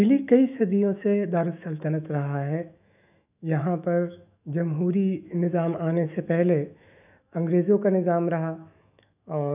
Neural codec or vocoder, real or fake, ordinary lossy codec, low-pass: autoencoder, 48 kHz, 128 numbers a frame, DAC-VAE, trained on Japanese speech; fake; none; 3.6 kHz